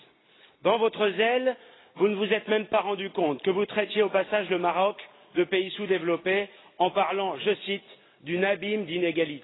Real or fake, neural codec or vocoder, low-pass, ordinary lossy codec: real; none; 7.2 kHz; AAC, 16 kbps